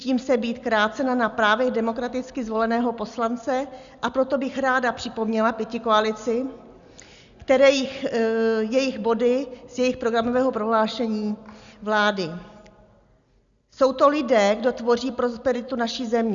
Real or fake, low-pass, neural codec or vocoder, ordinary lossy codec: real; 7.2 kHz; none; Opus, 64 kbps